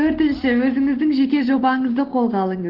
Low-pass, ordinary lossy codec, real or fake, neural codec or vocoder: 5.4 kHz; Opus, 16 kbps; real; none